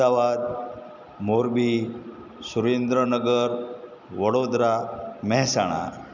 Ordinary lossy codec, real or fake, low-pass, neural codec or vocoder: none; real; 7.2 kHz; none